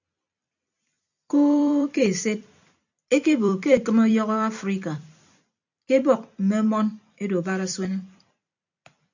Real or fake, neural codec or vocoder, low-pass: fake; vocoder, 44.1 kHz, 128 mel bands every 256 samples, BigVGAN v2; 7.2 kHz